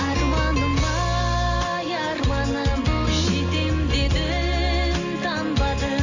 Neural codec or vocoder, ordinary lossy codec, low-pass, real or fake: none; none; 7.2 kHz; real